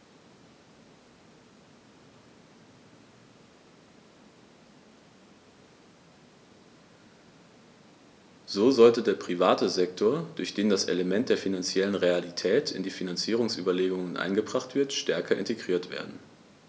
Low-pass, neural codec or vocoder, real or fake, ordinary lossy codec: none; none; real; none